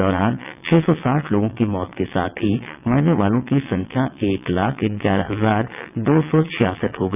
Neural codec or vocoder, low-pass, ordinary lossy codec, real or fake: vocoder, 22.05 kHz, 80 mel bands, WaveNeXt; 3.6 kHz; none; fake